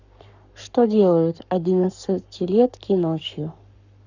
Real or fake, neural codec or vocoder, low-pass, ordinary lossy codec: fake; codec, 44.1 kHz, 7.8 kbps, Pupu-Codec; 7.2 kHz; none